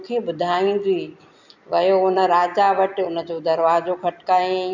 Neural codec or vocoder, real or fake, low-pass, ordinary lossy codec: none; real; 7.2 kHz; none